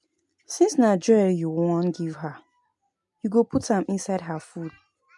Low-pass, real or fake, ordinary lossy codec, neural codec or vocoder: 10.8 kHz; real; MP3, 64 kbps; none